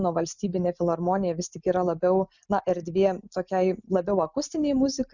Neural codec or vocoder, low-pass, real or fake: none; 7.2 kHz; real